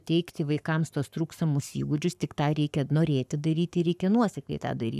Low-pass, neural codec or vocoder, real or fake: 14.4 kHz; codec, 44.1 kHz, 7.8 kbps, Pupu-Codec; fake